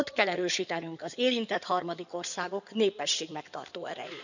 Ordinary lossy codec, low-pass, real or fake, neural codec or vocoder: none; 7.2 kHz; fake; codec, 16 kHz in and 24 kHz out, 2.2 kbps, FireRedTTS-2 codec